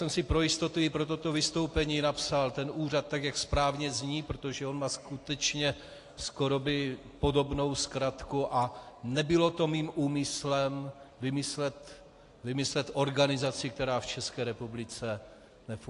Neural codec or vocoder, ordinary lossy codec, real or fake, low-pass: none; AAC, 48 kbps; real; 10.8 kHz